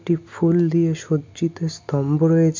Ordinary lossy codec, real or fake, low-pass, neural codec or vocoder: MP3, 64 kbps; real; 7.2 kHz; none